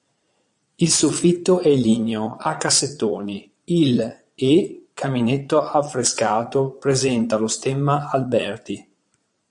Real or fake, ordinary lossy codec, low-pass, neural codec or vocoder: fake; MP3, 64 kbps; 9.9 kHz; vocoder, 22.05 kHz, 80 mel bands, Vocos